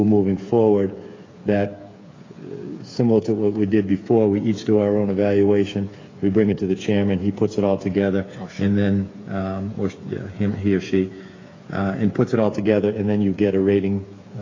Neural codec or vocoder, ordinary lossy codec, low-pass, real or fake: codec, 16 kHz, 8 kbps, FreqCodec, smaller model; AAC, 32 kbps; 7.2 kHz; fake